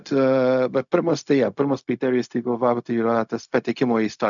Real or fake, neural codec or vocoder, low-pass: fake; codec, 16 kHz, 0.4 kbps, LongCat-Audio-Codec; 7.2 kHz